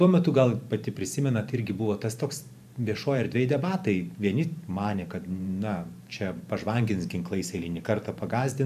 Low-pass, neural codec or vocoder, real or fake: 14.4 kHz; vocoder, 44.1 kHz, 128 mel bands every 512 samples, BigVGAN v2; fake